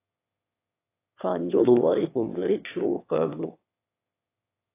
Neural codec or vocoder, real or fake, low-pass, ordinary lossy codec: autoencoder, 22.05 kHz, a latent of 192 numbers a frame, VITS, trained on one speaker; fake; 3.6 kHz; AAC, 24 kbps